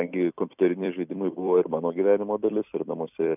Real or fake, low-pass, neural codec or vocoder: fake; 3.6 kHz; vocoder, 44.1 kHz, 128 mel bands every 256 samples, BigVGAN v2